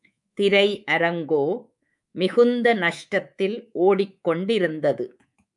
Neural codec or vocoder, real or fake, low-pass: codec, 24 kHz, 3.1 kbps, DualCodec; fake; 10.8 kHz